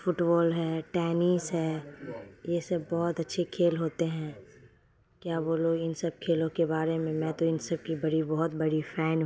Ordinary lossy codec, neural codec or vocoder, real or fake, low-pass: none; none; real; none